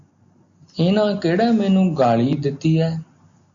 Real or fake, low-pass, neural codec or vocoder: real; 7.2 kHz; none